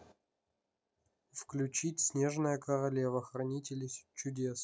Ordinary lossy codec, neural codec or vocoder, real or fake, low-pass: none; none; real; none